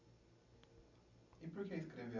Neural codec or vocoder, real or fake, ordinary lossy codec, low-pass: none; real; none; 7.2 kHz